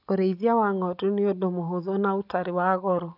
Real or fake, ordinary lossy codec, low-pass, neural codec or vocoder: real; none; 5.4 kHz; none